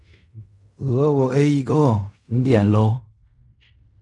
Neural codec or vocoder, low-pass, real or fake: codec, 16 kHz in and 24 kHz out, 0.4 kbps, LongCat-Audio-Codec, fine tuned four codebook decoder; 10.8 kHz; fake